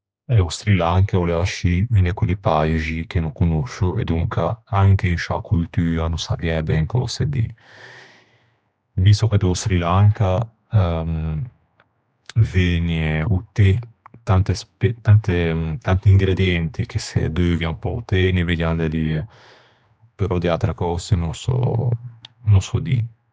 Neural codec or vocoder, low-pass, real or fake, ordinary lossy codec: codec, 16 kHz, 2 kbps, X-Codec, HuBERT features, trained on general audio; none; fake; none